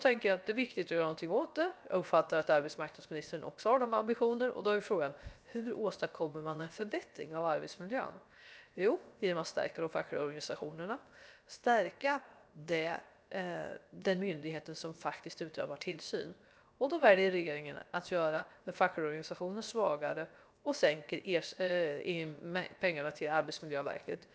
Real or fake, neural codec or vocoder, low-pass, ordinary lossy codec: fake; codec, 16 kHz, 0.7 kbps, FocalCodec; none; none